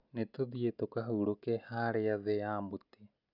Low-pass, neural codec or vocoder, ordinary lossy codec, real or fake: 5.4 kHz; none; none; real